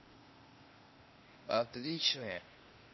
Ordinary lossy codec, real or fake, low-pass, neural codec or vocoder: MP3, 24 kbps; fake; 7.2 kHz; codec, 16 kHz, 0.8 kbps, ZipCodec